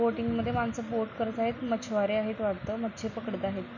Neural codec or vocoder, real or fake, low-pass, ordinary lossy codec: none; real; 7.2 kHz; none